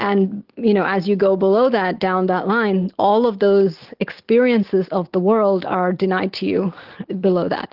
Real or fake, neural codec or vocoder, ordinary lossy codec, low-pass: real; none; Opus, 16 kbps; 5.4 kHz